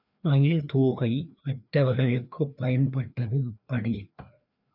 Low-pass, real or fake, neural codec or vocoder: 5.4 kHz; fake; codec, 16 kHz, 2 kbps, FreqCodec, larger model